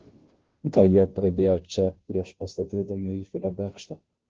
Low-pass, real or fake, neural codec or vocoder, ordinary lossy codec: 7.2 kHz; fake; codec, 16 kHz, 0.5 kbps, FunCodec, trained on Chinese and English, 25 frames a second; Opus, 16 kbps